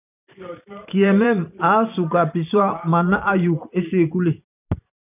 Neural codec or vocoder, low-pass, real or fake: vocoder, 44.1 kHz, 128 mel bands every 512 samples, BigVGAN v2; 3.6 kHz; fake